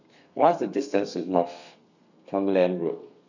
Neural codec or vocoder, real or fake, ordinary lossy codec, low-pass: codec, 32 kHz, 1.9 kbps, SNAC; fake; none; 7.2 kHz